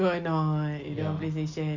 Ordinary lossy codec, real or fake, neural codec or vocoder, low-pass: Opus, 64 kbps; real; none; 7.2 kHz